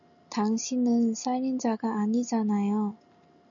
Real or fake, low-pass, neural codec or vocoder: real; 7.2 kHz; none